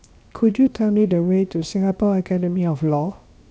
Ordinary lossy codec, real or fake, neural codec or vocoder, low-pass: none; fake; codec, 16 kHz, 0.7 kbps, FocalCodec; none